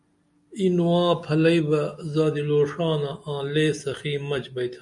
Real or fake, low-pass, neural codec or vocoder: real; 10.8 kHz; none